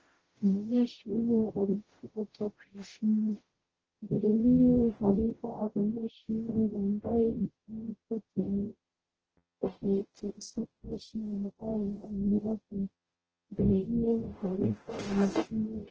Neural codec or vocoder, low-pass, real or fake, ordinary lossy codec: codec, 44.1 kHz, 0.9 kbps, DAC; 7.2 kHz; fake; Opus, 16 kbps